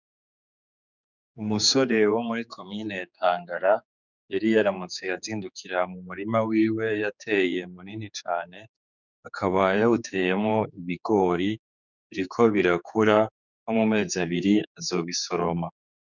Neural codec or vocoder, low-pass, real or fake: codec, 16 kHz, 4 kbps, X-Codec, HuBERT features, trained on general audio; 7.2 kHz; fake